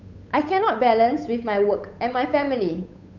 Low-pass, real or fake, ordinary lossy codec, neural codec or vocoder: 7.2 kHz; fake; none; codec, 16 kHz, 8 kbps, FunCodec, trained on Chinese and English, 25 frames a second